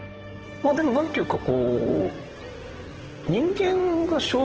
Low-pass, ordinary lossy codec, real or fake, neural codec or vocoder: 7.2 kHz; Opus, 16 kbps; fake; codec, 16 kHz in and 24 kHz out, 2.2 kbps, FireRedTTS-2 codec